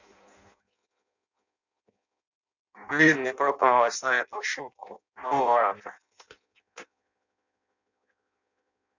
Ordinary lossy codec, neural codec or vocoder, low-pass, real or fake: none; codec, 16 kHz in and 24 kHz out, 0.6 kbps, FireRedTTS-2 codec; 7.2 kHz; fake